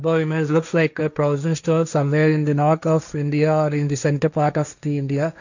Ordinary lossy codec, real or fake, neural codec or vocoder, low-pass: none; fake; codec, 16 kHz, 1.1 kbps, Voila-Tokenizer; 7.2 kHz